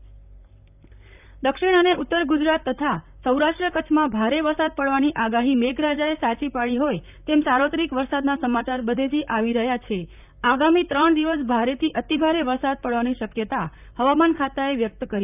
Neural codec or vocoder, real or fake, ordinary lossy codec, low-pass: vocoder, 44.1 kHz, 128 mel bands, Pupu-Vocoder; fake; none; 3.6 kHz